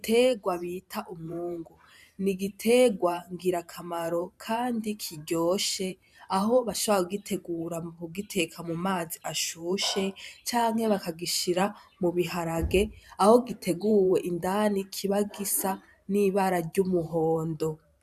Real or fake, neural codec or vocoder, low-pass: fake; vocoder, 44.1 kHz, 128 mel bands every 512 samples, BigVGAN v2; 14.4 kHz